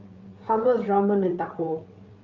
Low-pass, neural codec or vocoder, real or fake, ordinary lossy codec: 7.2 kHz; codec, 16 kHz, 8 kbps, FreqCodec, larger model; fake; Opus, 32 kbps